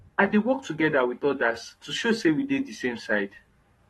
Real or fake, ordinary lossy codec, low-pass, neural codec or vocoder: fake; AAC, 32 kbps; 19.8 kHz; codec, 44.1 kHz, 7.8 kbps, Pupu-Codec